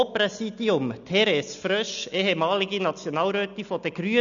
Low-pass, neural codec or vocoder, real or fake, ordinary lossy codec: 7.2 kHz; none; real; none